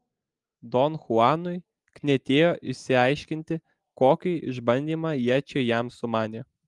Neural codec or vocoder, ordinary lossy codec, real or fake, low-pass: none; Opus, 24 kbps; real; 10.8 kHz